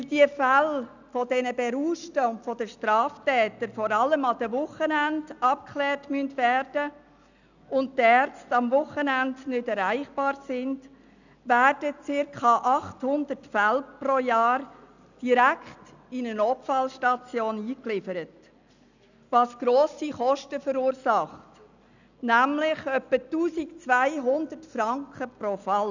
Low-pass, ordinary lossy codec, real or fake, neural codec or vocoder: 7.2 kHz; none; real; none